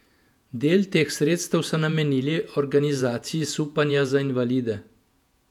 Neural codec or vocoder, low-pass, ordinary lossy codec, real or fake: vocoder, 48 kHz, 128 mel bands, Vocos; 19.8 kHz; none; fake